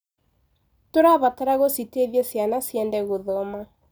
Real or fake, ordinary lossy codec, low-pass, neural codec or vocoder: real; none; none; none